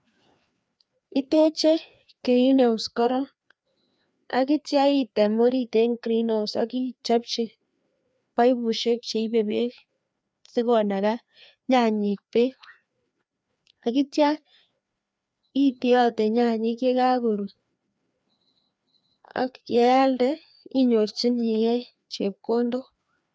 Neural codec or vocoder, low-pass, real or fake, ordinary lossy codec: codec, 16 kHz, 2 kbps, FreqCodec, larger model; none; fake; none